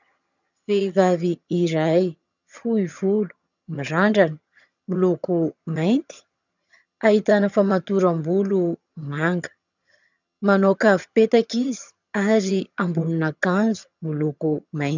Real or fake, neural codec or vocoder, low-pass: fake; vocoder, 22.05 kHz, 80 mel bands, HiFi-GAN; 7.2 kHz